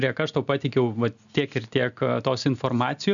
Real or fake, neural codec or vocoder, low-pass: real; none; 7.2 kHz